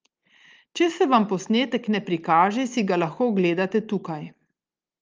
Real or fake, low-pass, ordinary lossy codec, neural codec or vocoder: real; 7.2 kHz; Opus, 24 kbps; none